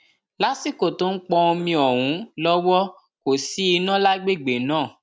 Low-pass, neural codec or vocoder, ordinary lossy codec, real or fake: none; none; none; real